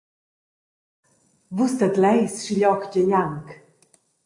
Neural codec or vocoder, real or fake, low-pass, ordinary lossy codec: none; real; 10.8 kHz; AAC, 48 kbps